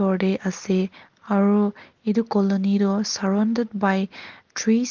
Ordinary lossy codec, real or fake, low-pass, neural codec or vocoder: Opus, 16 kbps; real; 7.2 kHz; none